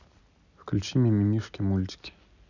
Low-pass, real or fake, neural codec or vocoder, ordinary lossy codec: 7.2 kHz; real; none; none